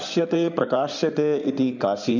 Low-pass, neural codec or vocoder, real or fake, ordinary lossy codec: 7.2 kHz; codec, 16 kHz in and 24 kHz out, 2.2 kbps, FireRedTTS-2 codec; fake; none